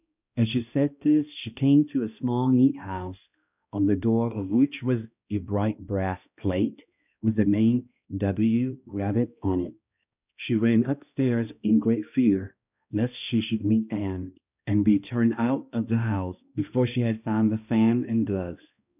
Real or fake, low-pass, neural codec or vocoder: fake; 3.6 kHz; codec, 16 kHz, 1 kbps, X-Codec, HuBERT features, trained on balanced general audio